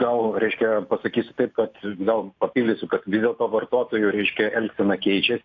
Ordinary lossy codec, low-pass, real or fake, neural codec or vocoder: AAC, 48 kbps; 7.2 kHz; real; none